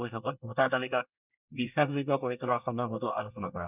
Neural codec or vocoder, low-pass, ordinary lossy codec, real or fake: codec, 24 kHz, 1 kbps, SNAC; 3.6 kHz; none; fake